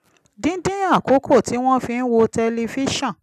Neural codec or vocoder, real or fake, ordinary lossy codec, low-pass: none; real; none; 14.4 kHz